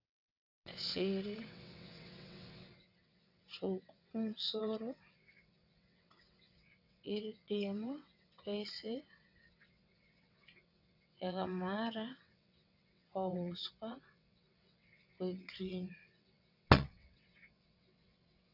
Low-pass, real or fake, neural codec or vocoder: 5.4 kHz; fake; vocoder, 22.05 kHz, 80 mel bands, WaveNeXt